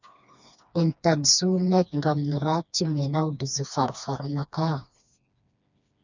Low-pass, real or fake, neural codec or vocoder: 7.2 kHz; fake; codec, 16 kHz, 2 kbps, FreqCodec, smaller model